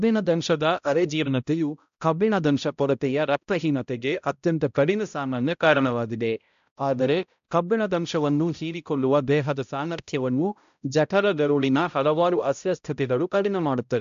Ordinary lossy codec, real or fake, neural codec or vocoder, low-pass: AAC, 96 kbps; fake; codec, 16 kHz, 0.5 kbps, X-Codec, HuBERT features, trained on balanced general audio; 7.2 kHz